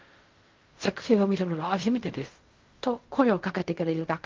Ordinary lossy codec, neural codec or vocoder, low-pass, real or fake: Opus, 32 kbps; codec, 16 kHz in and 24 kHz out, 0.4 kbps, LongCat-Audio-Codec, fine tuned four codebook decoder; 7.2 kHz; fake